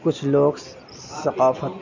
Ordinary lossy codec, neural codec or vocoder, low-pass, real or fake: none; none; 7.2 kHz; real